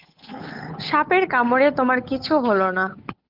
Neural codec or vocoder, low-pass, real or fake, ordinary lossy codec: codec, 16 kHz, 8 kbps, FunCodec, trained on Chinese and English, 25 frames a second; 5.4 kHz; fake; Opus, 24 kbps